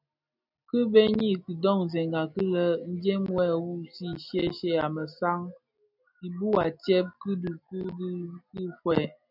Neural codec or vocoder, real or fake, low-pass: none; real; 5.4 kHz